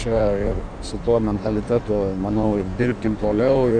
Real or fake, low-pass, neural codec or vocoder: fake; 9.9 kHz; codec, 16 kHz in and 24 kHz out, 1.1 kbps, FireRedTTS-2 codec